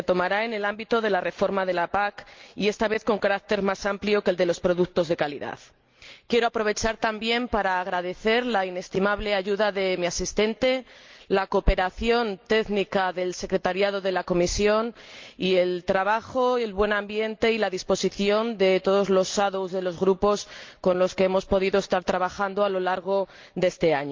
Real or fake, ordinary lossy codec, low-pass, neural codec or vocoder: real; Opus, 24 kbps; 7.2 kHz; none